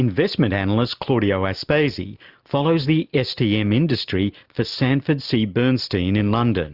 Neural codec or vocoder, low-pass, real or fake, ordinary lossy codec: none; 5.4 kHz; real; Opus, 64 kbps